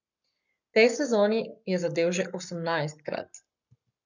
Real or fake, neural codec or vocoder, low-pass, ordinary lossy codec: fake; codec, 44.1 kHz, 7.8 kbps, Pupu-Codec; 7.2 kHz; none